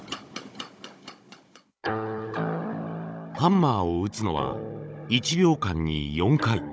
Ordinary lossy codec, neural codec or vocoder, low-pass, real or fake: none; codec, 16 kHz, 16 kbps, FunCodec, trained on Chinese and English, 50 frames a second; none; fake